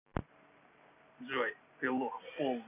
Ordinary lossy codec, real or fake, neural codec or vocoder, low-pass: none; real; none; 3.6 kHz